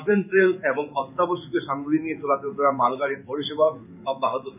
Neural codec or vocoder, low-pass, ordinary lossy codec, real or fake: codec, 16 kHz in and 24 kHz out, 1 kbps, XY-Tokenizer; 3.6 kHz; none; fake